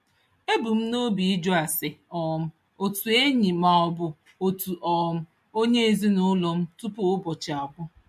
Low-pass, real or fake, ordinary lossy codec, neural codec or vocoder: 14.4 kHz; real; MP3, 64 kbps; none